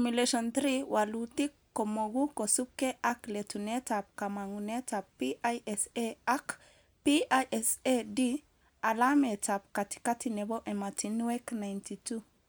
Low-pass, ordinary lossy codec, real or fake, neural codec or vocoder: none; none; real; none